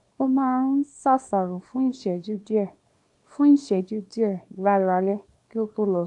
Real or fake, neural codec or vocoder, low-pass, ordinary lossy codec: fake; codec, 24 kHz, 0.9 kbps, WavTokenizer, small release; 10.8 kHz; AAC, 64 kbps